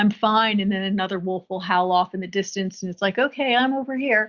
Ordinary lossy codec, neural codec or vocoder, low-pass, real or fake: Opus, 64 kbps; none; 7.2 kHz; real